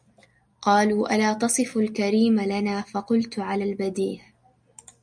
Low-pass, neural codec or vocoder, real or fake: 9.9 kHz; none; real